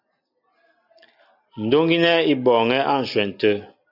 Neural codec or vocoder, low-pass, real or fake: none; 5.4 kHz; real